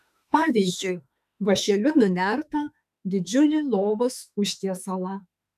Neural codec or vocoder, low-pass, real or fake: autoencoder, 48 kHz, 32 numbers a frame, DAC-VAE, trained on Japanese speech; 14.4 kHz; fake